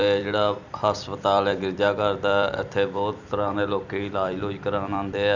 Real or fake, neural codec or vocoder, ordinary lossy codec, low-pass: fake; vocoder, 44.1 kHz, 128 mel bands every 256 samples, BigVGAN v2; none; 7.2 kHz